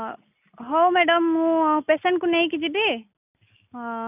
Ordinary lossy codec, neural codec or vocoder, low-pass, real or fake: none; none; 3.6 kHz; real